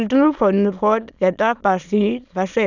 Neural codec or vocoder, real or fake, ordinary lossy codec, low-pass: autoencoder, 22.05 kHz, a latent of 192 numbers a frame, VITS, trained on many speakers; fake; none; 7.2 kHz